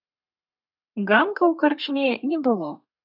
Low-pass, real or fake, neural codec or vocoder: 5.4 kHz; fake; codec, 32 kHz, 1.9 kbps, SNAC